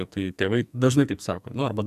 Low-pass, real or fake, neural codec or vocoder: 14.4 kHz; fake; codec, 44.1 kHz, 2.6 kbps, SNAC